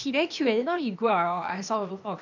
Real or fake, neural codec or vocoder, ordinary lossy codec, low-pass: fake; codec, 16 kHz, 0.8 kbps, ZipCodec; none; 7.2 kHz